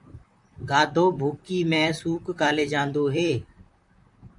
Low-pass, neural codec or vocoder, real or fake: 10.8 kHz; vocoder, 44.1 kHz, 128 mel bands, Pupu-Vocoder; fake